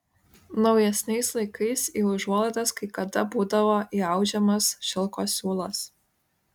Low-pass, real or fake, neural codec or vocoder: 19.8 kHz; real; none